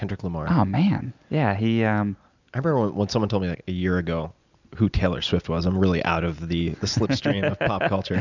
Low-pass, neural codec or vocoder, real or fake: 7.2 kHz; none; real